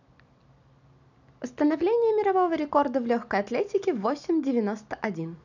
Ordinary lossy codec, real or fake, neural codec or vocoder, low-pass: none; real; none; 7.2 kHz